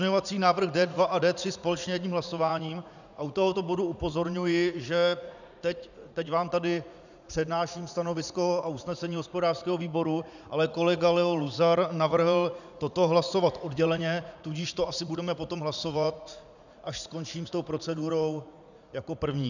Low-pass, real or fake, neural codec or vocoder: 7.2 kHz; fake; vocoder, 44.1 kHz, 80 mel bands, Vocos